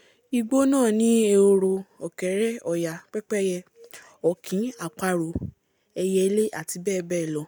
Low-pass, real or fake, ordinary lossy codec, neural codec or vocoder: none; real; none; none